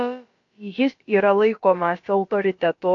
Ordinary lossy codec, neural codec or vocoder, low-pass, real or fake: AAC, 48 kbps; codec, 16 kHz, about 1 kbps, DyCAST, with the encoder's durations; 7.2 kHz; fake